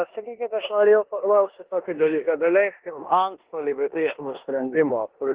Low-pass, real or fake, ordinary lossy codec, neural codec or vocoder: 3.6 kHz; fake; Opus, 16 kbps; codec, 16 kHz in and 24 kHz out, 0.9 kbps, LongCat-Audio-Codec, four codebook decoder